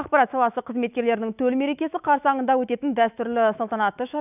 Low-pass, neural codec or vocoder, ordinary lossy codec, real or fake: 3.6 kHz; autoencoder, 48 kHz, 128 numbers a frame, DAC-VAE, trained on Japanese speech; none; fake